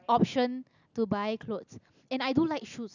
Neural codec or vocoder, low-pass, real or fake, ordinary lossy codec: none; 7.2 kHz; real; none